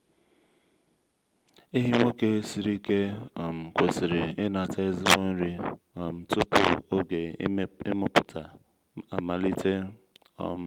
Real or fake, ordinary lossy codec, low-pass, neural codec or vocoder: real; Opus, 32 kbps; 19.8 kHz; none